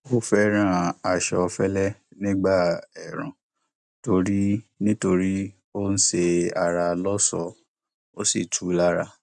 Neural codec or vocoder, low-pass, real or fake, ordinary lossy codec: none; 10.8 kHz; real; none